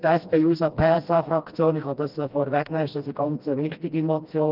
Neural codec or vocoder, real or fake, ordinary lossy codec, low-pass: codec, 16 kHz, 1 kbps, FreqCodec, smaller model; fake; Opus, 24 kbps; 5.4 kHz